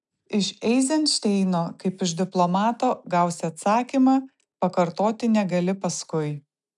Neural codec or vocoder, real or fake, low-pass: none; real; 10.8 kHz